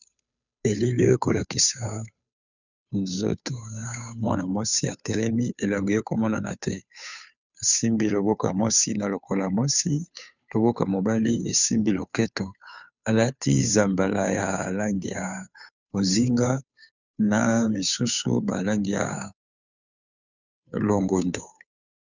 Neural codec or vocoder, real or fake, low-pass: codec, 16 kHz, 2 kbps, FunCodec, trained on Chinese and English, 25 frames a second; fake; 7.2 kHz